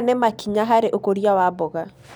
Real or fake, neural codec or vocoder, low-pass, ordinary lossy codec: real; none; 19.8 kHz; none